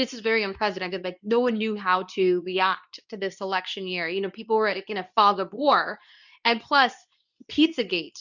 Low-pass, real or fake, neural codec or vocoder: 7.2 kHz; fake; codec, 24 kHz, 0.9 kbps, WavTokenizer, medium speech release version 2